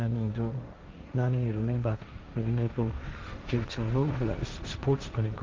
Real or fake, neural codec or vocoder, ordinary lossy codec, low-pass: fake; codec, 16 kHz, 1.1 kbps, Voila-Tokenizer; Opus, 32 kbps; 7.2 kHz